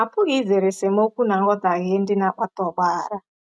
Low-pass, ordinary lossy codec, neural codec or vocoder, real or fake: none; none; none; real